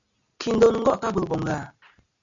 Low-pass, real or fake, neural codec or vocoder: 7.2 kHz; real; none